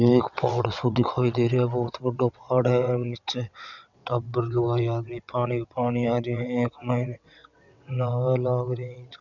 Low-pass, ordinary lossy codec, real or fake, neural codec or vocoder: 7.2 kHz; none; fake; vocoder, 22.05 kHz, 80 mel bands, WaveNeXt